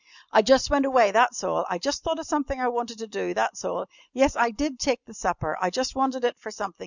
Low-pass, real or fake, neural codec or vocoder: 7.2 kHz; real; none